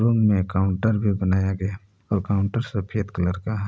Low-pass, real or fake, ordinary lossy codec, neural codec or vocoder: none; real; none; none